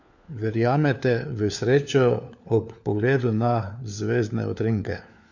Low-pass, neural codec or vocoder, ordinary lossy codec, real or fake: 7.2 kHz; codec, 16 kHz, 4 kbps, FunCodec, trained on LibriTTS, 50 frames a second; none; fake